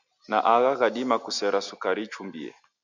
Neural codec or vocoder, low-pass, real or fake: none; 7.2 kHz; real